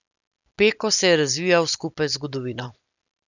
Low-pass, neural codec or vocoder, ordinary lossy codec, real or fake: 7.2 kHz; none; none; real